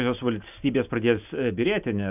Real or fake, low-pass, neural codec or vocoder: real; 3.6 kHz; none